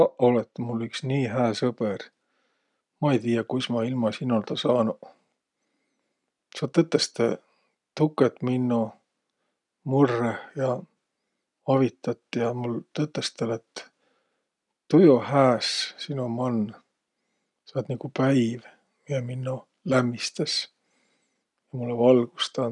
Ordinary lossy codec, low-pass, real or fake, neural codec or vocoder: none; 10.8 kHz; real; none